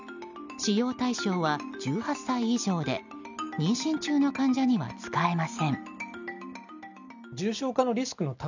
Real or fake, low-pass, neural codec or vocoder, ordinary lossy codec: real; 7.2 kHz; none; none